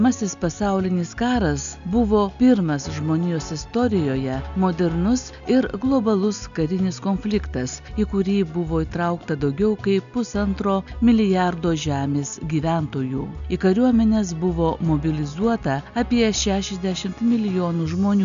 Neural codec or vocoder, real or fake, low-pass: none; real; 7.2 kHz